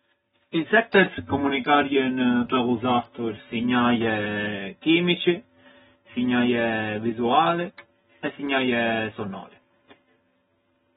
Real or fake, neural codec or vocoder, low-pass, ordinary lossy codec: real; none; 19.8 kHz; AAC, 16 kbps